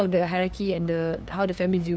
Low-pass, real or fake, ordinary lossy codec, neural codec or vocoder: none; fake; none; codec, 16 kHz, 2 kbps, FunCodec, trained on LibriTTS, 25 frames a second